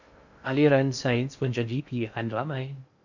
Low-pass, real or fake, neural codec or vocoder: 7.2 kHz; fake; codec, 16 kHz in and 24 kHz out, 0.6 kbps, FocalCodec, streaming, 2048 codes